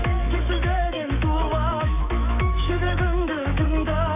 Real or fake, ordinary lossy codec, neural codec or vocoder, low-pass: fake; none; autoencoder, 48 kHz, 128 numbers a frame, DAC-VAE, trained on Japanese speech; 3.6 kHz